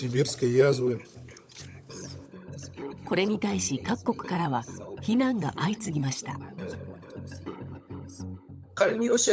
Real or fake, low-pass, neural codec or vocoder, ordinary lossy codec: fake; none; codec, 16 kHz, 16 kbps, FunCodec, trained on LibriTTS, 50 frames a second; none